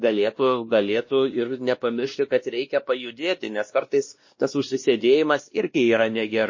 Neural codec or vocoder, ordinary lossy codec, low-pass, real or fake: codec, 16 kHz, 1 kbps, X-Codec, WavLM features, trained on Multilingual LibriSpeech; MP3, 32 kbps; 7.2 kHz; fake